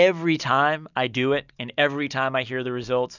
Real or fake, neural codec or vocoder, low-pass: real; none; 7.2 kHz